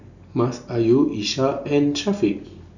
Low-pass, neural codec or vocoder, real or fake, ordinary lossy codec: 7.2 kHz; none; real; none